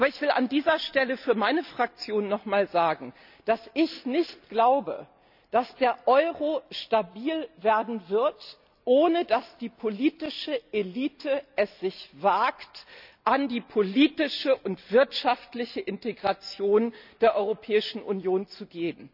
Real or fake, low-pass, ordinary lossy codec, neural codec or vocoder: real; 5.4 kHz; none; none